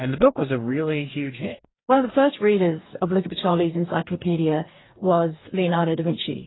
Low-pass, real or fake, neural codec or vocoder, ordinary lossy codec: 7.2 kHz; fake; codec, 44.1 kHz, 2.6 kbps, DAC; AAC, 16 kbps